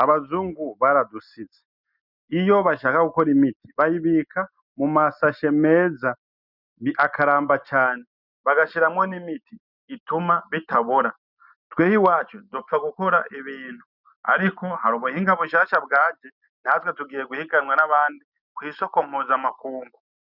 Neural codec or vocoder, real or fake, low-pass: none; real; 5.4 kHz